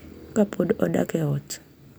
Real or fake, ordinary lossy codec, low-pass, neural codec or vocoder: real; none; none; none